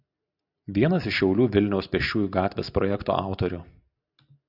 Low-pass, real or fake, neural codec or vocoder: 5.4 kHz; real; none